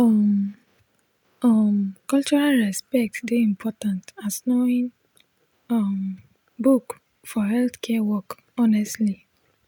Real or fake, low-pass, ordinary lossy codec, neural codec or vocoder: real; 19.8 kHz; none; none